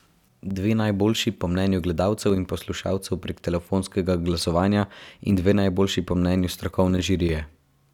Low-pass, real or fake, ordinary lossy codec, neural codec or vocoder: 19.8 kHz; real; none; none